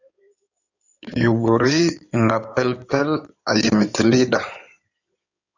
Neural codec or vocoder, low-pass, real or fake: codec, 16 kHz in and 24 kHz out, 2.2 kbps, FireRedTTS-2 codec; 7.2 kHz; fake